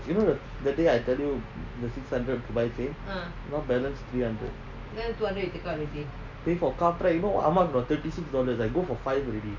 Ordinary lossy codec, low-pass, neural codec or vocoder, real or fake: MP3, 64 kbps; 7.2 kHz; none; real